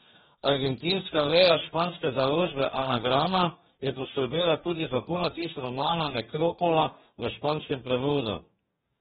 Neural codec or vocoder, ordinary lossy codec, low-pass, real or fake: codec, 44.1 kHz, 2.6 kbps, DAC; AAC, 16 kbps; 19.8 kHz; fake